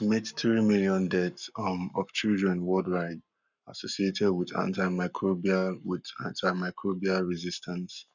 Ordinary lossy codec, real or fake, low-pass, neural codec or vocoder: none; fake; 7.2 kHz; codec, 44.1 kHz, 7.8 kbps, Pupu-Codec